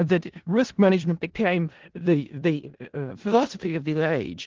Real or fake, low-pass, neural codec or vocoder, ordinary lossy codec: fake; 7.2 kHz; codec, 16 kHz in and 24 kHz out, 0.4 kbps, LongCat-Audio-Codec, four codebook decoder; Opus, 16 kbps